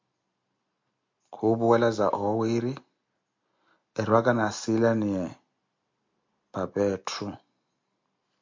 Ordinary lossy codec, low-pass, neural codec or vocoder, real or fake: MP3, 48 kbps; 7.2 kHz; none; real